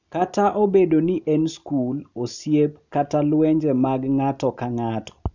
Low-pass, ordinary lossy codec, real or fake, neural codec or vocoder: 7.2 kHz; none; real; none